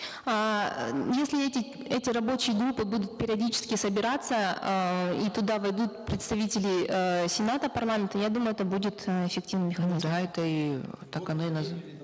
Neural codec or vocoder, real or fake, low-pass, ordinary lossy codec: none; real; none; none